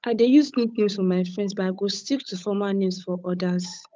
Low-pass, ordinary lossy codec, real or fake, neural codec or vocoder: none; none; fake; codec, 16 kHz, 8 kbps, FunCodec, trained on Chinese and English, 25 frames a second